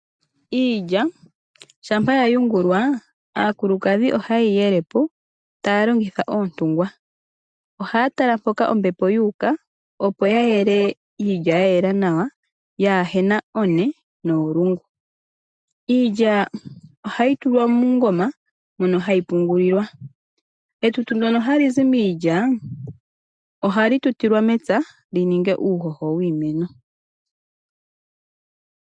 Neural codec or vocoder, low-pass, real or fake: none; 9.9 kHz; real